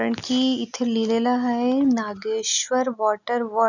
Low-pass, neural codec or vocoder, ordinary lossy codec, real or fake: 7.2 kHz; none; none; real